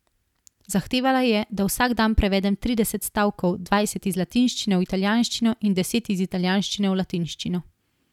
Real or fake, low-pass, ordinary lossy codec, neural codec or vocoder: real; 19.8 kHz; none; none